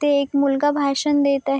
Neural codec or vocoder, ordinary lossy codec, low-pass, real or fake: none; none; none; real